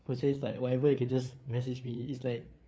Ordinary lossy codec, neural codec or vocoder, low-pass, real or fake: none; codec, 16 kHz, 4 kbps, FreqCodec, larger model; none; fake